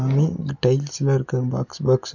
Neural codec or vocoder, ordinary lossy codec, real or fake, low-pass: none; none; real; 7.2 kHz